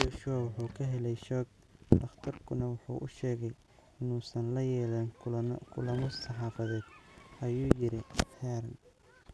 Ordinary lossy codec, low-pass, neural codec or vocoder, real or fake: none; none; none; real